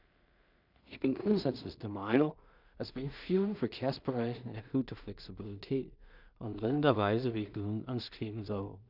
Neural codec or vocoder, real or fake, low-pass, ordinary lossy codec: codec, 16 kHz in and 24 kHz out, 0.4 kbps, LongCat-Audio-Codec, two codebook decoder; fake; 5.4 kHz; none